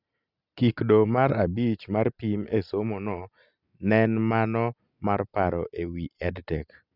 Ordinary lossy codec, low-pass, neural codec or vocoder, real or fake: none; 5.4 kHz; none; real